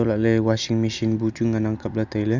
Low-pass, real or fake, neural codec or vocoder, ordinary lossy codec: 7.2 kHz; real; none; none